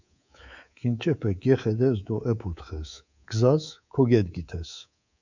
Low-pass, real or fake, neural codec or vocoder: 7.2 kHz; fake; codec, 24 kHz, 3.1 kbps, DualCodec